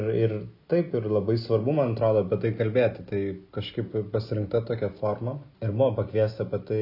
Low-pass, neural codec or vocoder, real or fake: 5.4 kHz; none; real